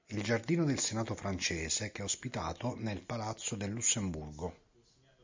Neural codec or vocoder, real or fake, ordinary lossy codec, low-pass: none; real; MP3, 64 kbps; 7.2 kHz